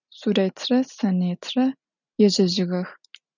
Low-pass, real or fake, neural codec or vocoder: 7.2 kHz; real; none